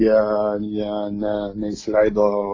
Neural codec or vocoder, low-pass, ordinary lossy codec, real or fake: none; 7.2 kHz; AAC, 32 kbps; real